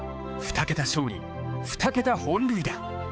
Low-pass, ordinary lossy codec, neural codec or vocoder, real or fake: none; none; codec, 16 kHz, 4 kbps, X-Codec, HuBERT features, trained on balanced general audio; fake